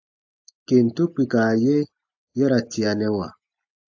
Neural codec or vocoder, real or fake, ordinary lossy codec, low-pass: none; real; AAC, 48 kbps; 7.2 kHz